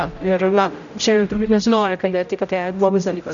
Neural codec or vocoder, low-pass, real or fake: codec, 16 kHz, 0.5 kbps, X-Codec, HuBERT features, trained on general audio; 7.2 kHz; fake